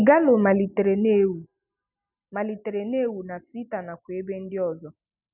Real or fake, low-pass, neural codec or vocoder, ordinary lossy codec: real; 3.6 kHz; none; none